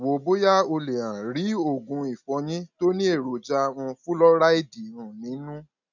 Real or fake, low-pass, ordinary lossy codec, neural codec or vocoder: real; 7.2 kHz; none; none